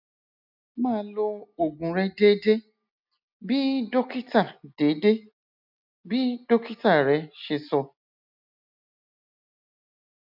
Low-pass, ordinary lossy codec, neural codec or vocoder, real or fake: 5.4 kHz; none; none; real